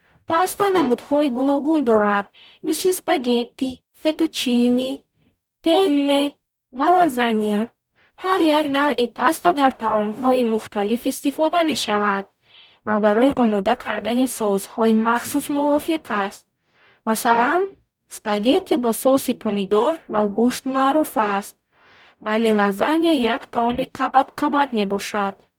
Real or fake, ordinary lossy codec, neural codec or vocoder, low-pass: fake; none; codec, 44.1 kHz, 0.9 kbps, DAC; 19.8 kHz